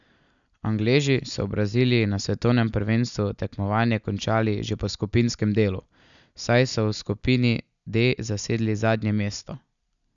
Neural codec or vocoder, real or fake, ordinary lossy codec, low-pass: none; real; none; 7.2 kHz